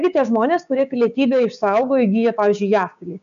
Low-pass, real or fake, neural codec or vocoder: 7.2 kHz; fake; codec, 16 kHz, 6 kbps, DAC